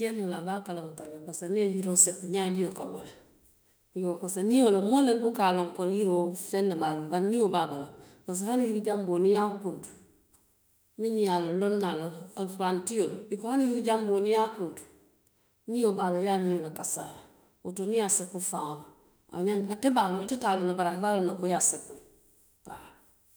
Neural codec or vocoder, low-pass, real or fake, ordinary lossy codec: autoencoder, 48 kHz, 32 numbers a frame, DAC-VAE, trained on Japanese speech; none; fake; none